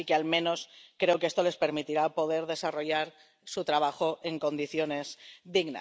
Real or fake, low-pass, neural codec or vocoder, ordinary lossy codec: real; none; none; none